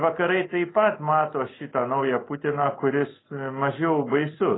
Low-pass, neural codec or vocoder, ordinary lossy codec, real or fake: 7.2 kHz; vocoder, 44.1 kHz, 128 mel bands every 256 samples, BigVGAN v2; AAC, 16 kbps; fake